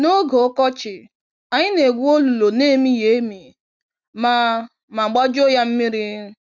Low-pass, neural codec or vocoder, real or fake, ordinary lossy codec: 7.2 kHz; none; real; none